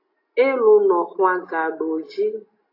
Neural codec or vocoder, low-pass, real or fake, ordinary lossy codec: none; 5.4 kHz; real; AAC, 32 kbps